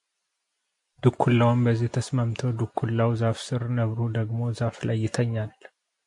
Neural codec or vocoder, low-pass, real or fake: none; 10.8 kHz; real